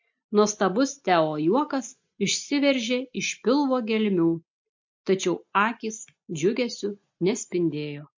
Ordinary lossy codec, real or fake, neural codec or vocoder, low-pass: MP3, 48 kbps; real; none; 7.2 kHz